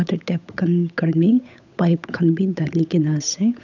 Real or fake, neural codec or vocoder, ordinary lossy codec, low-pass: fake; codec, 16 kHz, 8 kbps, FunCodec, trained on Chinese and English, 25 frames a second; none; 7.2 kHz